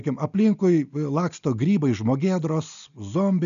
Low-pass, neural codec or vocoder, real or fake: 7.2 kHz; none; real